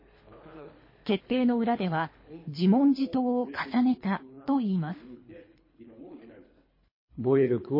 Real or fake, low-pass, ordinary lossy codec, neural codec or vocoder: fake; 5.4 kHz; MP3, 24 kbps; codec, 24 kHz, 3 kbps, HILCodec